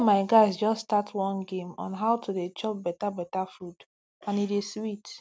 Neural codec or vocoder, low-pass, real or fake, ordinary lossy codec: none; none; real; none